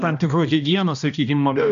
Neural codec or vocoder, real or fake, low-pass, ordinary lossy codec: codec, 16 kHz, 1 kbps, X-Codec, HuBERT features, trained on balanced general audio; fake; 7.2 kHz; AAC, 96 kbps